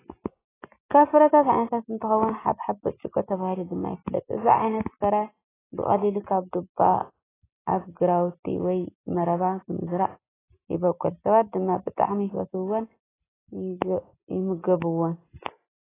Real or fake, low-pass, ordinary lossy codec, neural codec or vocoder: real; 3.6 kHz; AAC, 16 kbps; none